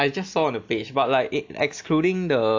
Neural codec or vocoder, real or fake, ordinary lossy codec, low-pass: none; real; AAC, 48 kbps; 7.2 kHz